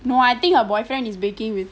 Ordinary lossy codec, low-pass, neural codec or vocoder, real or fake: none; none; none; real